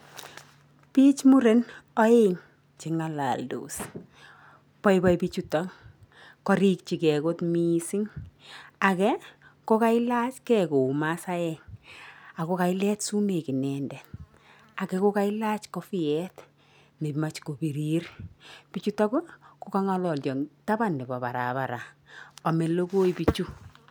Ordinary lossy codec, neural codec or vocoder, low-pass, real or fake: none; none; none; real